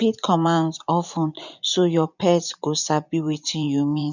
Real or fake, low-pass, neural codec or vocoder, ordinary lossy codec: fake; 7.2 kHz; vocoder, 24 kHz, 100 mel bands, Vocos; none